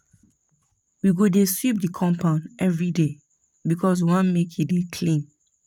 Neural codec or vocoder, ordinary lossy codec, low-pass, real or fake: vocoder, 44.1 kHz, 128 mel bands, Pupu-Vocoder; none; 19.8 kHz; fake